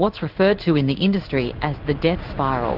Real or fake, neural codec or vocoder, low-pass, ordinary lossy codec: fake; codec, 16 kHz in and 24 kHz out, 1 kbps, XY-Tokenizer; 5.4 kHz; Opus, 16 kbps